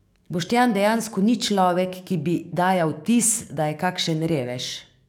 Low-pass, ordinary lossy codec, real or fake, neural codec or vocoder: 19.8 kHz; none; fake; codec, 44.1 kHz, 7.8 kbps, DAC